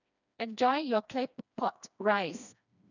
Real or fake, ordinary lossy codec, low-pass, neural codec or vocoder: fake; none; 7.2 kHz; codec, 16 kHz, 2 kbps, FreqCodec, smaller model